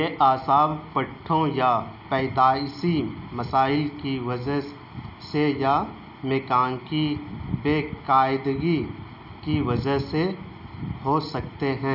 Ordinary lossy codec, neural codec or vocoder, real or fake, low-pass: none; none; real; 5.4 kHz